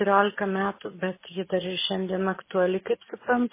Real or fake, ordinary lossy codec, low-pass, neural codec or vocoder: real; MP3, 16 kbps; 3.6 kHz; none